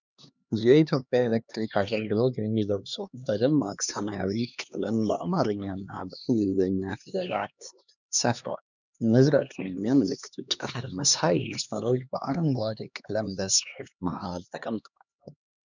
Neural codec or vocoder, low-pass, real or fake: codec, 16 kHz, 2 kbps, X-Codec, HuBERT features, trained on LibriSpeech; 7.2 kHz; fake